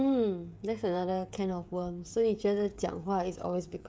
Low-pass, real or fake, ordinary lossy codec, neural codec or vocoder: none; fake; none; codec, 16 kHz, 16 kbps, FreqCodec, smaller model